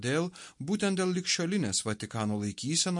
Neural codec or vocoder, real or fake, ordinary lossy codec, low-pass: none; real; MP3, 48 kbps; 10.8 kHz